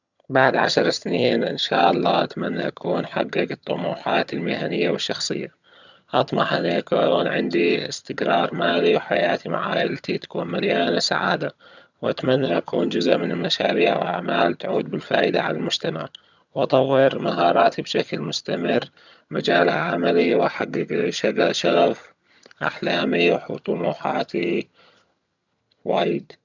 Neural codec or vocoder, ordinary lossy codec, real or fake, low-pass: vocoder, 22.05 kHz, 80 mel bands, HiFi-GAN; none; fake; 7.2 kHz